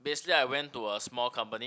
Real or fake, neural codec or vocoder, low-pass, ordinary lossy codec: real; none; none; none